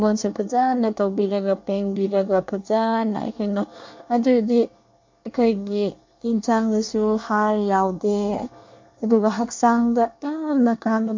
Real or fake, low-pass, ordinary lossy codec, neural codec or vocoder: fake; 7.2 kHz; MP3, 48 kbps; codec, 24 kHz, 1 kbps, SNAC